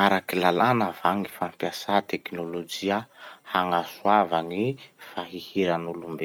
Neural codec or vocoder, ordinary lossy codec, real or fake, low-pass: none; none; real; 19.8 kHz